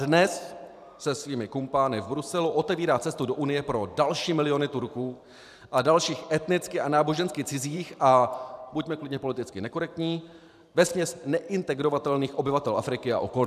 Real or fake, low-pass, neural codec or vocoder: fake; 14.4 kHz; vocoder, 44.1 kHz, 128 mel bands every 512 samples, BigVGAN v2